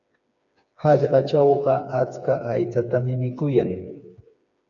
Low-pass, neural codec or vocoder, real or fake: 7.2 kHz; codec, 16 kHz, 4 kbps, FreqCodec, smaller model; fake